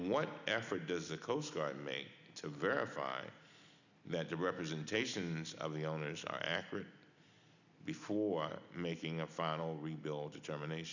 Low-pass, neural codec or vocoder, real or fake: 7.2 kHz; none; real